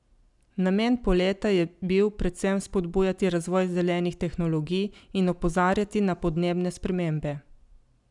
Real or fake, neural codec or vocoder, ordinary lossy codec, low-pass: real; none; none; 10.8 kHz